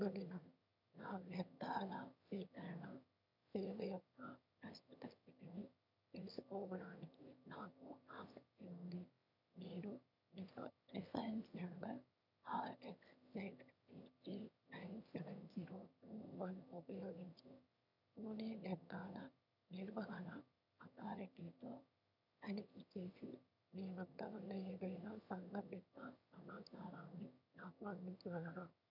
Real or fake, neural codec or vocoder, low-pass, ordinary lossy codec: fake; autoencoder, 22.05 kHz, a latent of 192 numbers a frame, VITS, trained on one speaker; 5.4 kHz; none